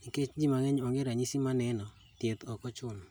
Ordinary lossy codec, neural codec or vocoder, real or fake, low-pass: none; none; real; none